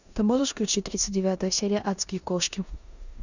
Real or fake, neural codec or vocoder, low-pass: fake; codec, 16 kHz in and 24 kHz out, 0.9 kbps, LongCat-Audio-Codec, four codebook decoder; 7.2 kHz